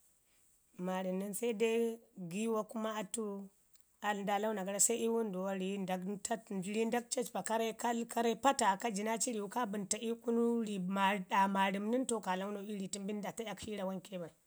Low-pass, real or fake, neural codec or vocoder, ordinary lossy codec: none; real; none; none